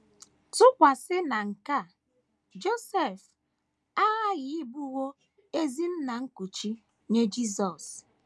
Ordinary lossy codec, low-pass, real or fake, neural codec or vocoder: none; none; real; none